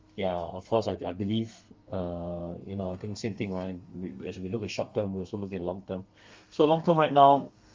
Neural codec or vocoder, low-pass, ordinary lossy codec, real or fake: codec, 32 kHz, 1.9 kbps, SNAC; 7.2 kHz; Opus, 32 kbps; fake